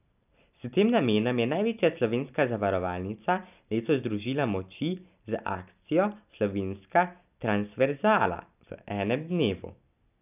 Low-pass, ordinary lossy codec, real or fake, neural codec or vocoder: 3.6 kHz; none; real; none